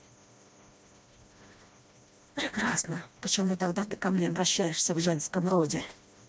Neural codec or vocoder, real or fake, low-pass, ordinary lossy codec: codec, 16 kHz, 1 kbps, FreqCodec, smaller model; fake; none; none